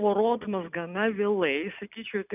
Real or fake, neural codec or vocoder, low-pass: real; none; 3.6 kHz